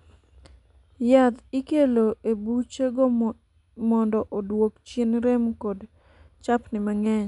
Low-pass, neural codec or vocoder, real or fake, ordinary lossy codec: 10.8 kHz; none; real; none